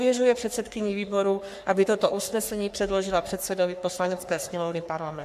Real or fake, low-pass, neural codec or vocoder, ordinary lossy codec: fake; 14.4 kHz; codec, 44.1 kHz, 3.4 kbps, Pupu-Codec; AAC, 96 kbps